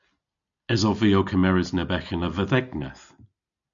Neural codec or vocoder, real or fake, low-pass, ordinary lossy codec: none; real; 7.2 kHz; MP3, 96 kbps